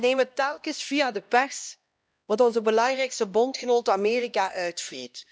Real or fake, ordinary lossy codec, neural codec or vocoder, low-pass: fake; none; codec, 16 kHz, 1 kbps, X-Codec, HuBERT features, trained on LibriSpeech; none